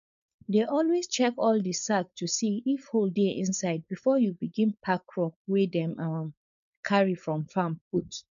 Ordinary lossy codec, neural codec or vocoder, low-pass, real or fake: none; codec, 16 kHz, 4.8 kbps, FACodec; 7.2 kHz; fake